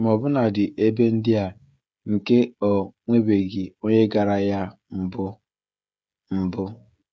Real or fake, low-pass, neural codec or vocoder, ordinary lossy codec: fake; none; codec, 16 kHz, 16 kbps, FreqCodec, smaller model; none